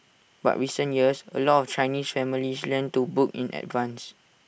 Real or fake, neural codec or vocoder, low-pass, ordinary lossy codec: real; none; none; none